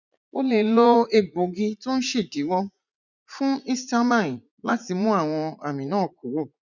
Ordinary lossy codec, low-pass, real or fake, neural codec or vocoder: none; 7.2 kHz; fake; vocoder, 44.1 kHz, 80 mel bands, Vocos